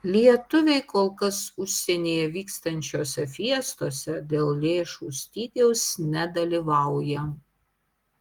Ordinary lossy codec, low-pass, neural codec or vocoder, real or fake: Opus, 24 kbps; 19.8 kHz; none; real